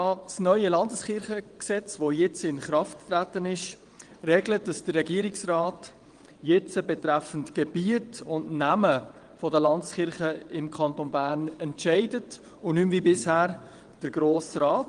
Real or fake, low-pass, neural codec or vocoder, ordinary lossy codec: fake; 9.9 kHz; vocoder, 22.05 kHz, 80 mel bands, WaveNeXt; Opus, 24 kbps